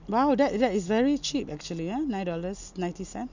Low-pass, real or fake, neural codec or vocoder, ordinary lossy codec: 7.2 kHz; real; none; none